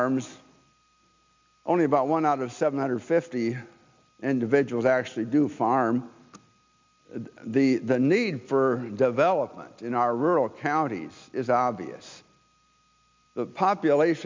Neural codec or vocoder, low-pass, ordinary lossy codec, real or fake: none; 7.2 kHz; MP3, 64 kbps; real